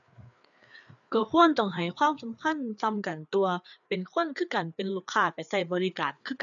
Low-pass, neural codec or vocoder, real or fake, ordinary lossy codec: 7.2 kHz; codec, 16 kHz, 4 kbps, FreqCodec, larger model; fake; none